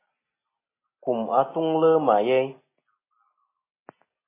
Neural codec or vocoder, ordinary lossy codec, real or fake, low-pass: none; MP3, 16 kbps; real; 3.6 kHz